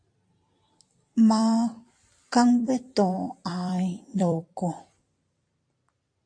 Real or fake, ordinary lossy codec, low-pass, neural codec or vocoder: fake; AAC, 48 kbps; 9.9 kHz; vocoder, 44.1 kHz, 128 mel bands every 256 samples, BigVGAN v2